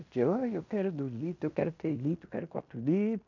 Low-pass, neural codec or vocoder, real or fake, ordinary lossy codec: 7.2 kHz; codec, 16 kHz in and 24 kHz out, 0.9 kbps, LongCat-Audio-Codec, fine tuned four codebook decoder; fake; none